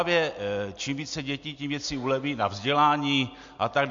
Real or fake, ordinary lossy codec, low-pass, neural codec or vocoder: real; MP3, 48 kbps; 7.2 kHz; none